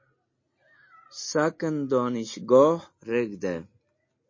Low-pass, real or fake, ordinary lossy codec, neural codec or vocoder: 7.2 kHz; real; MP3, 32 kbps; none